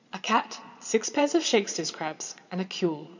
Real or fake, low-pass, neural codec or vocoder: fake; 7.2 kHz; vocoder, 22.05 kHz, 80 mel bands, Vocos